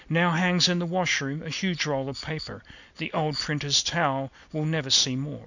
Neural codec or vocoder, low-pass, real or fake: none; 7.2 kHz; real